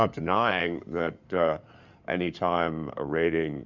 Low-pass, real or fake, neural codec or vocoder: 7.2 kHz; fake; codec, 16 kHz in and 24 kHz out, 2.2 kbps, FireRedTTS-2 codec